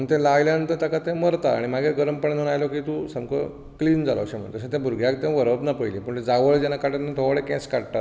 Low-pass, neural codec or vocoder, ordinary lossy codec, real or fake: none; none; none; real